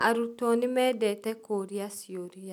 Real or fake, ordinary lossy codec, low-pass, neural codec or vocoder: real; none; 19.8 kHz; none